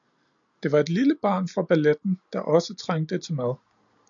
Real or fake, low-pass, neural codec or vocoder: real; 7.2 kHz; none